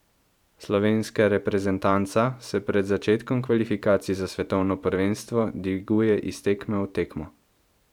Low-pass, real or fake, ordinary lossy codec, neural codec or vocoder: 19.8 kHz; fake; none; vocoder, 44.1 kHz, 128 mel bands every 512 samples, BigVGAN v2